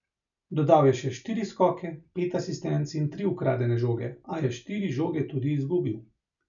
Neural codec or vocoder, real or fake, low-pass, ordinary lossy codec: none; real; 7.2 kHz; none